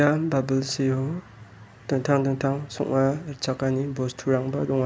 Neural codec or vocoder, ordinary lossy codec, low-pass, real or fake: none; none; none; real